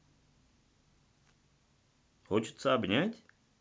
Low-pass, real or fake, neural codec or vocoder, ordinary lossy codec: none; real; none; none